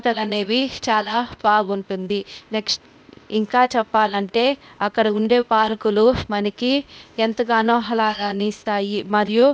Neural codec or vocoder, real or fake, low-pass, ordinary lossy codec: codec, 16 kHz, 0.8 kbps, ZipCodec; fake; none; none